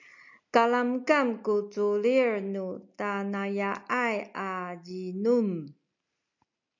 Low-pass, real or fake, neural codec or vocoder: 7.2 kHz; real; none